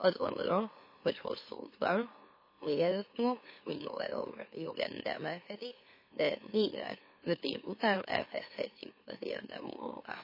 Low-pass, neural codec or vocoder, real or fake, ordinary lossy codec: 5.4 kHz; autoencoder, 44.1 kHz, a latent of 192 numbers a frame, MeloTTS; fake; MP3, 24 kbps